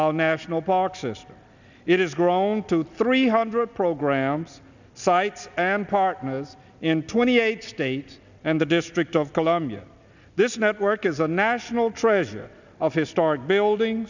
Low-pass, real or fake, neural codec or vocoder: 7.2 kHz; real; none